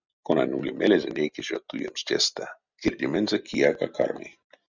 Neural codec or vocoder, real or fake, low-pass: none; real; 7.2 kHz